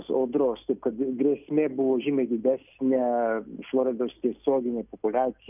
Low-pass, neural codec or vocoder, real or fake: 3.6 kHz; none; real